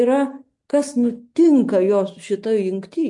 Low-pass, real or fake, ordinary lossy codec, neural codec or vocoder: 10.8 kHz; real; MP3, 64 kbps; none